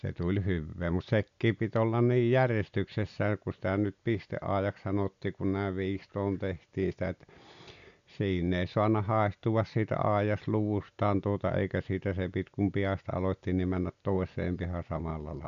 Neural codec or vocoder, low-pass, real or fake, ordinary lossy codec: none; 7.2 kHz; real; none